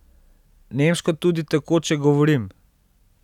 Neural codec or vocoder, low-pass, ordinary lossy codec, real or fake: none; 19.8 kHz; none; real